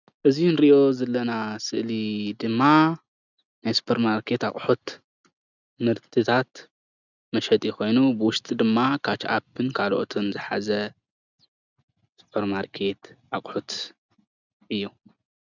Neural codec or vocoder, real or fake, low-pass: none; real; 7.2 kHz